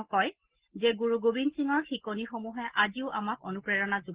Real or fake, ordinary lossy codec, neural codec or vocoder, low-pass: real; Opus, 16 kbps; none; 3.6 kHz